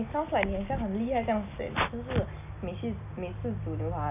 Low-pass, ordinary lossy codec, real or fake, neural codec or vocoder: 3.6 kHz; AAC, 32 kbps; real; none